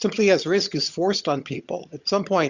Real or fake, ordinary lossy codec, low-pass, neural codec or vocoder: fake; Opus, 64 kbps; 7.2 kHz; vocoder, 22.05 kHz, 80 mel bands, HiFi-GAN